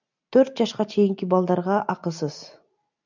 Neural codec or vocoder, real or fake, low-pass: none; real; 7.2 kHz